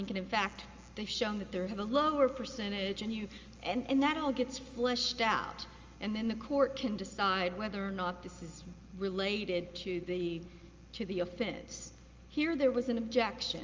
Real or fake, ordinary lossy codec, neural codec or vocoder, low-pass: real; Opus, 32 kbps; none; 7.2 kHz